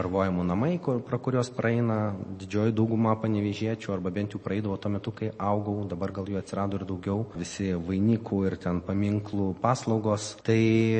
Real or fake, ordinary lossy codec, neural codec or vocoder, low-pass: real; MP3, 32 kbps; none; 10.8 kHz